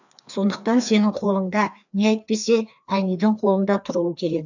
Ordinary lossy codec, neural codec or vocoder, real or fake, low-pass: none; codec, 16 kHz, 2 kbps, FreqCodec, larger model; fake; 7.2 kHz